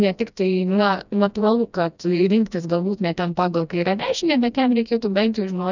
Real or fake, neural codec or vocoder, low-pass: fake; codec, 16 kHz, 1 kbps, FreqCodec, smaller model; 7.2 kHz